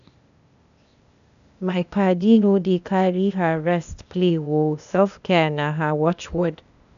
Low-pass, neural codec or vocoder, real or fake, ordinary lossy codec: 7.2 kHz; codec, 16 kHz, 0.8 kbps, ZipCodec; fake; none